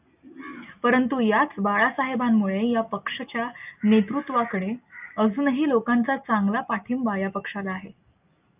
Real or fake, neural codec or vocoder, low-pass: real; none; 3.6 kHz